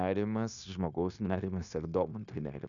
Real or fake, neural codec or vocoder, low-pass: fake; codec, 16 kHz, 0.9 kbps, LongCat-Audio-Codec; 7.2 kHz